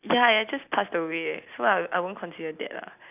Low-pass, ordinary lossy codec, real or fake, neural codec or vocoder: 3.6 kHz; none; real; none